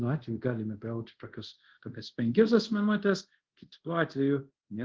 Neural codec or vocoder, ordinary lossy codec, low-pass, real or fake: codec, 24 kHz, 0.5 kbps, DualCodec; Opus, 16 kbps; 7.2 kHz; fake